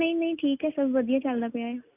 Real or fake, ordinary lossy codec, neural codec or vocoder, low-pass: real; none; none; 3.6 kHz